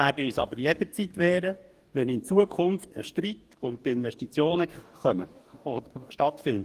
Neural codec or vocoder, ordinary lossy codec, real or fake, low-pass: codec, 44.1 kHz, 2.6 kbps, DAC; Opus, 24 kbps; fake; 14.4 kHz